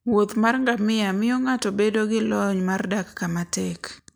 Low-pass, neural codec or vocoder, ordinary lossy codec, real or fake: none; none; none; real